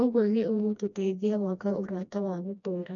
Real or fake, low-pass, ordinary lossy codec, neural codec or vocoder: fake; 7.2 kHz; none; codec, 16 kHz, 1 kbps, FreqCodec, smaller model